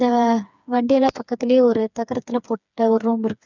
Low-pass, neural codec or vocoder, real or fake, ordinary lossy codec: 7.2 kHz; codec, 16 kHz, 4 kbps, FreqCodec, smaller model; fake; none